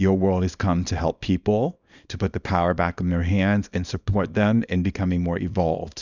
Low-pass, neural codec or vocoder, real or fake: 7.2 kHz; codec, 24 kHz, 0.9 kbps, WavTokenizer, small release; fake